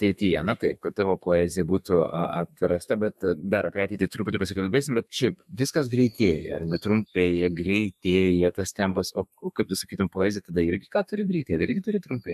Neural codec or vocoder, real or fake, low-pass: codec, 32 kHz, 1.9 kbps, SNAC; fake; 14.4 kHz